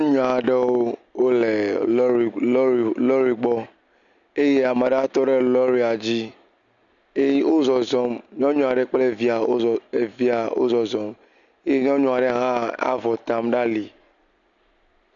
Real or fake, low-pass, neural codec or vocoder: real; 7.2 kHz; none